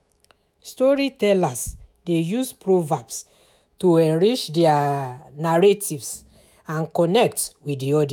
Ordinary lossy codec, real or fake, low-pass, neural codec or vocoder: none; fake; 19.8 kHz; autoencoder, 48 kHz, 128 numbers a frame, DAC-VAE, trained on Japanese speech